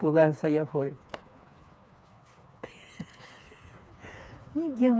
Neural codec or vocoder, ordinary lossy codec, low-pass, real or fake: codec, 16 kHz, 4 kbps, FreqCodec, smaller model; none; none; fake